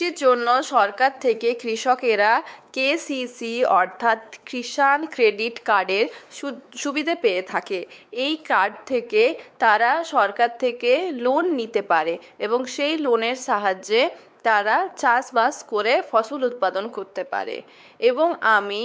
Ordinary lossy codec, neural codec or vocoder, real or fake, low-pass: none; codec, 16 kHz, 4 kbps, X-Codec, WavLM features, trained on Multilingual LibriSpeech; fake; none